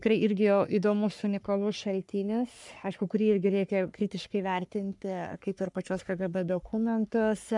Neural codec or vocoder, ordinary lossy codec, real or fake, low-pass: codec, 44.1 kHz, 3.4 kbps, Pupu-Codec; MP3, 96 kbps; fake; 10.8 kHz